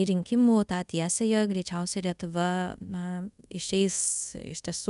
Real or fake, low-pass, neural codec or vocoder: fake; 10.8 kHz; codec, 24 kHz, 0.5 kbps, DualCodec